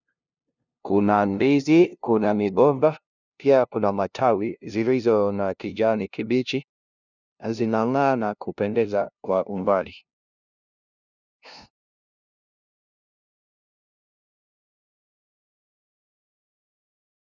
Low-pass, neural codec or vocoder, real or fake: 7.2 kHz; codec, 16 kHz, 0.5 kbps, FunCodec, trained on LibriTTS, 25 frames a second; fake